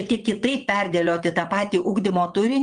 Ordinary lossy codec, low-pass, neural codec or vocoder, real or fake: Opus, 32 kbps; 9.9 kHz; vocoder, 22.05 kHz, 80 mel bands, WaveNeXt; fake